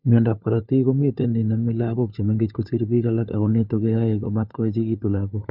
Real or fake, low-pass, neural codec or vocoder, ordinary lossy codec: fake; 5.4 kHz; codec, 16 kHz, 4 kbps, FunCodec, trained on LibriTTS, 50 frames a second; none